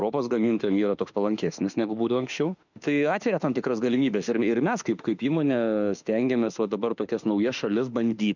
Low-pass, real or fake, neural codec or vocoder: 7.2 kHz; fake; autoencoder, 48 kHz, 32 numbers a frame, DAC-VAE, trained on Japanese speech